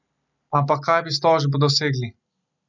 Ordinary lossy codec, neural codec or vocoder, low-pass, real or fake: none; none; 7.2 kHz; real